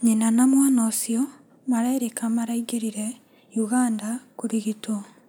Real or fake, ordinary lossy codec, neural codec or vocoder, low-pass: real; none; none; none